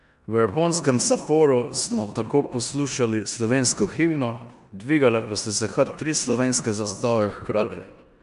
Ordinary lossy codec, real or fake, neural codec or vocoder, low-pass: none; fake; codec, 16 kHz in and 24 kHz out, 0.9 kbps, LongCat-Audio-Codec, four codebook decoder; 10.8 kHz